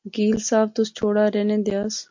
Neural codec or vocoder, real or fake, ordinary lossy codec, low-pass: none; real; MP3, 48 kbps; 7.2 kHz